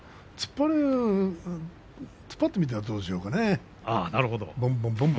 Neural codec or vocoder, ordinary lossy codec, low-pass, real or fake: none; none; none; real